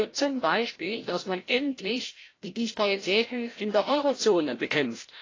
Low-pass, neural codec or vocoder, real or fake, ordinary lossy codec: 7.2 kHz; codec, 16 kHz, 0.5 kbps, FreqCodec, larger model; fake; AAC, 32 kbps